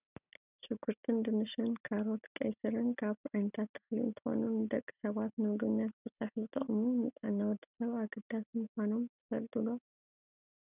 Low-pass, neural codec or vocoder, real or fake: 3.6 kHz; none; real